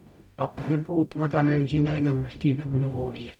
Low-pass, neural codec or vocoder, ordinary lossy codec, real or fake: 19.8 kHz; codec, 44.1 kHz, 0.9 kbps, DAC; none; fake